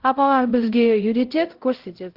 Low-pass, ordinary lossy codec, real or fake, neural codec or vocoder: 5.4 kHz; Opus, 16 kbps; fake; codec, 16 kHz, 0.5 kbps, X-Codec, HuBERT features, trained on LibriSpeech